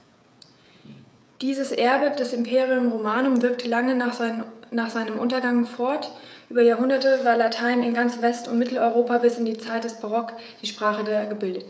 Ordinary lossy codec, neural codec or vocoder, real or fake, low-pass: none; codec, 16 kHz, 16 kbps, FreqCodec, smaller model; fake; none